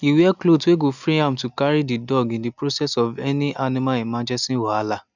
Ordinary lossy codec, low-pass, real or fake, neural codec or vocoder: none; 7.2 kHz; real; none